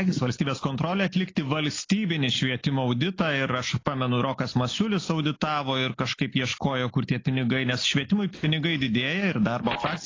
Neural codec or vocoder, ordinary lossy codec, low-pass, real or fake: none; AAC, 32 kbps; 7.2 kHz; real